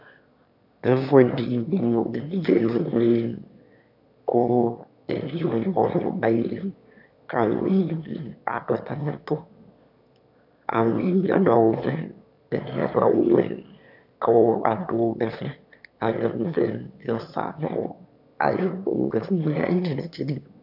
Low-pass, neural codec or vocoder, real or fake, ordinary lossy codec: 5.4 kHz; autoencoder, 22.05 kHz, a latent of 192 numbers a frame, VITS, trained on one speaker; fake; AAC, 48 kbps